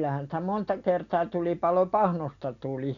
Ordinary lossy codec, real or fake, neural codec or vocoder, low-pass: none; real; none; 7.2 kHz